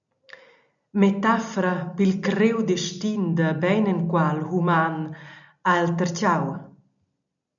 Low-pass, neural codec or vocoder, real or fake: 7.2 kHz; none; real